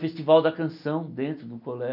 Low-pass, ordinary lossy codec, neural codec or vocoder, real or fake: 5.4 kHz; none; none; real